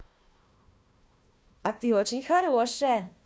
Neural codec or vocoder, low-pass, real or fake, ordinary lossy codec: codec, 16 kHz, 1 kbps, FunCodec, trained on Chinese and English, 50 frames a second; none; fake; none